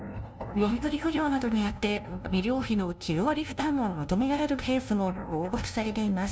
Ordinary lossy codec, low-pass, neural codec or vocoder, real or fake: none; none; codec, 16 kHz, 0.5 kbps, FunCodec, trained on LibriTTS, 25 frames a second; fake